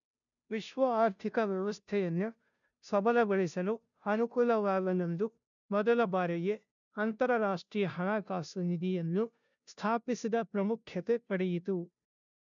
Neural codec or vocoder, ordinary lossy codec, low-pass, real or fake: codec, 16 kHz, 0.5 kbps, FunCodec, trained on Chinese and English, 25 frames a second; none; 7.2 kHz; fake